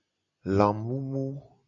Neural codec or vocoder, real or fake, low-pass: none; real; 7.2 kHz